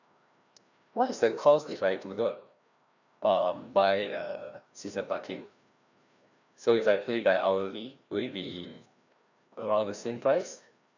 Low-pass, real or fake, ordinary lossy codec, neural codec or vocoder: 7.2 kHz; fake; none; codec, 16 kHz, 1 kbps, FreqCodec, larger model